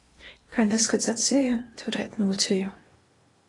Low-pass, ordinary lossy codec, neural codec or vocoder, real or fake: 10.8 kHz; AAC, 32 kbps; codec, 16 kHz in and 24 kHz out, 0.8 kbps, FocalCodec, streaming, 65536 codes; fake